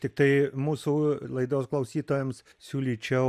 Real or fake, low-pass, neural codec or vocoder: real; 14.4 kHz; none